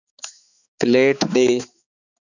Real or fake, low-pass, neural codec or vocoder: fake; 7.2 kHz; codec, 16 kHz, 4 kbps, X-Codec, HuBERT features, trained on balanced general audio